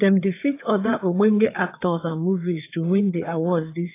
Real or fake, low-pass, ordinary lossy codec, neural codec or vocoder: fake; 3.6 kHz; AAC, 24 kbps; codec, 16 kHz, 4 kbps, FreqCodec, larger model